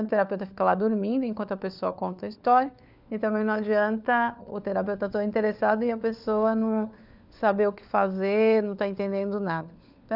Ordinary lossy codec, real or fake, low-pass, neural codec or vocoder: none; fake; 5.4 kHz; codec, 16 kHz, 4 kbps, FunCodec, trained on LibriTTS, 50 frames a second